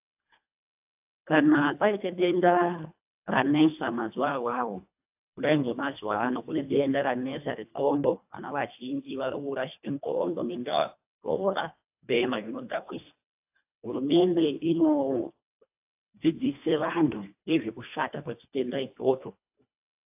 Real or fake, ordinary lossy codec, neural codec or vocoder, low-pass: fake; AAC, 32 kbps; codec, 24 kHz, 1.5 kbps, HILCodec; 3.6 kHz